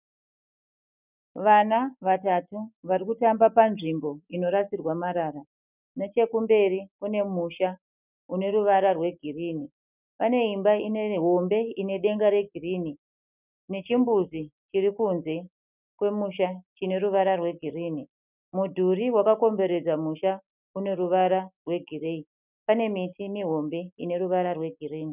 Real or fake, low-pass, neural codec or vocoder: real; 3.6 kHz; none